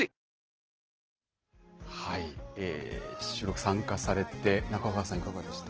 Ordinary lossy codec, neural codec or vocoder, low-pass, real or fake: Opus, 16 kbps; none; 7.2 kHz; real